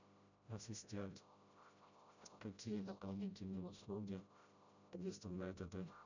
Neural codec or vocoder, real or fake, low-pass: codec, 16 kHz, 0.5 kbps, FreqCodec, smaller model; fake; 7.2 kHz